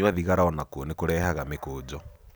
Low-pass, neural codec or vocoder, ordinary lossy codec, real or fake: none; none; none; real